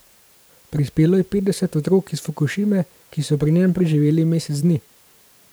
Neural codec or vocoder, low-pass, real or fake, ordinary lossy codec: vocoder, 44.1 kHz, 128 mel bands every 512 samples, BigVGAN v2; none; fake; none